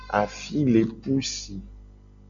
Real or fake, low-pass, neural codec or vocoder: real; 7.2 kHz; none